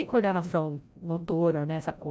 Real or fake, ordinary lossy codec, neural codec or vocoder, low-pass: fake; none; codec, 16 kHz, 0.5 kbps, FreqCodec, larger model; none